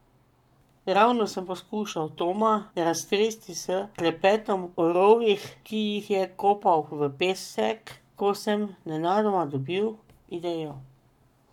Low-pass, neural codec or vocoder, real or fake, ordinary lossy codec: 19.8 kHz; codec, 44.1 kHz, 7.8 kbps, Pupu-Codec; fake; none